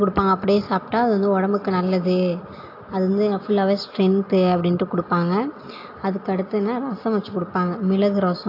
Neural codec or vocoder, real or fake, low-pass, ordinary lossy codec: none; real; 5.4 kHz; AAC, 32 kbps